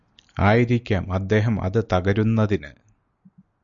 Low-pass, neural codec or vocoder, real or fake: 7.2 kHz; none; real